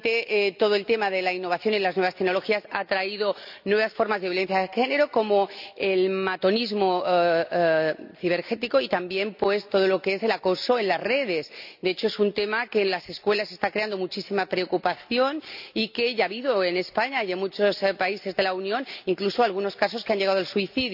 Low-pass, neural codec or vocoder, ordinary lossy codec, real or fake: 5.4 kHz; none; none; real